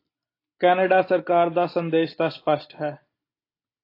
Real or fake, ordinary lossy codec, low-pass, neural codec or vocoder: real; AAC, 32 kbps; 5.4 kHz; none